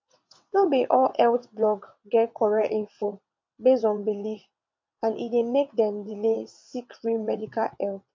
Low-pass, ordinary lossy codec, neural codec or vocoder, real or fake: 7.2 kHz; MP3, 48 kbps; vocoder, 22.05 kHz, 80 mel bands, WaveNeXt; fake